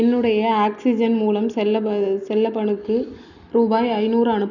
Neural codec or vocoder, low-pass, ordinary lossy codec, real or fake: none; 7.2 kHz; none; real